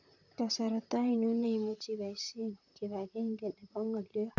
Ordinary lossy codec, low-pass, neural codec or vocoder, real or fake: none; 7.2 kHz; vocoder, 44.1 kHz, 128 mel bands, Pupu-Vocoder; fake